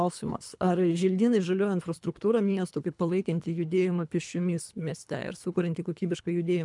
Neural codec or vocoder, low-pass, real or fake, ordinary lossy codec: codec, 24 kHz, 3 kbps, HILCodec; 10.8 kHz; fake; MP3, 96 kbps